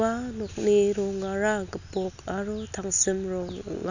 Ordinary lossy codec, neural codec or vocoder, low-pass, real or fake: none; none; 7.2 kHz; real